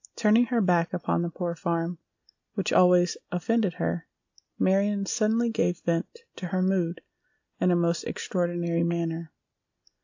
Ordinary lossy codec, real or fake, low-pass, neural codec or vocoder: AAC, 48 kbps; real; 7.2 kHz; none